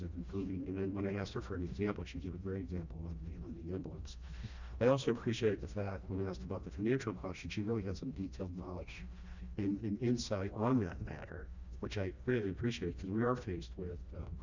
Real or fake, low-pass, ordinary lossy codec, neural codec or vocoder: fake; 7.2 kHz; Opus, 64 kbps; codec, 16 kHz, 1 kbps, FreqCodec, smaller model